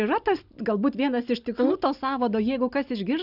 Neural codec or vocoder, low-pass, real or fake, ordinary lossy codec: none; 5.4 kHz; real; Opus, 64 kbps